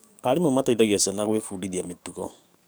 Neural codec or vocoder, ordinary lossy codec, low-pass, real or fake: codec, 44.1 kHz, 7.8 kbps, DAC; none; none; fake